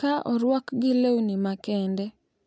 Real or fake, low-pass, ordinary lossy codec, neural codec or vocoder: real; none; none; none